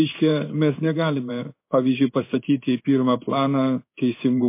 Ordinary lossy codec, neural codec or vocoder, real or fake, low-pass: MP3, 24 kbps; codec, 16 kHz in and 24 kHz out, 1 kbps, XY-Tokenizer; fake; 3.6 kHz